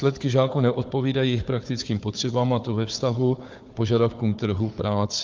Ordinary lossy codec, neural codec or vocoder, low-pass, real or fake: Opus, 32 kbps; codec, 16 kHz, 4 kbps, FunCodec, trained on Chinese and English, 50 frames a second; 7.2 kHz; fake